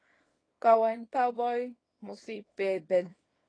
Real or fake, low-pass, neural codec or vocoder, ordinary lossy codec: fake; 9.9 kHz; codec, 24 kHz, 0.9 kbps, WavTokenizer, small release; AAC, 32 kbps